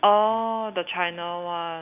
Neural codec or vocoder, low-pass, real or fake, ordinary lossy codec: none; 3.6 kHz; real; none